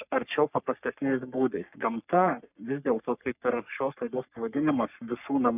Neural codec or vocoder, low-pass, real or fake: codec, 44.1 kHz, 3.4 kbps, Pupu-Codec; 3.6 kHz; fake